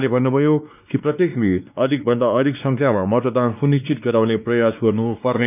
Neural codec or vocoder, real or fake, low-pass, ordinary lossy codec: codec, 16 kHz, 2 kbps, X-Codec, WavLM features, trained on Multilingual LibriSpeech; fake; 3.6 kHz; none